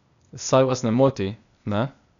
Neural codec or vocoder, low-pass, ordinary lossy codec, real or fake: codec, 16 kHz, 0.8 kbps, ZipCodec; 7.2 kHz; none; fake